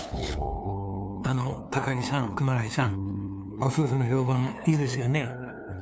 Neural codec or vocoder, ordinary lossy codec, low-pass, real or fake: codec, 16 kHz, 2 kbps, FunCodec, trained on LibriTTS, 25 frames a second; none; none; fake